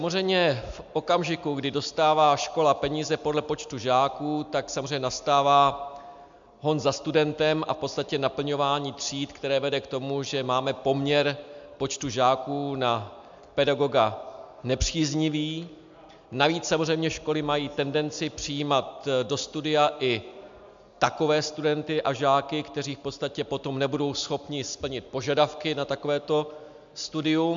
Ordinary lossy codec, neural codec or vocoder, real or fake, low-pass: MP3, 64 kbps; none; real; 7.2 kHz